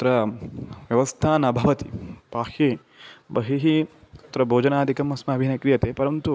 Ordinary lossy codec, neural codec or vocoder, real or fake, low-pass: none; none; real; none